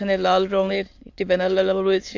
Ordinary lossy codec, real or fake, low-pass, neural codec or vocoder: AAC, 48 kbps; fake; 7.2 kHz; autoencoder, 22.05 kHz, a latent of 192 numbers a frame, VITS, trained on many speakers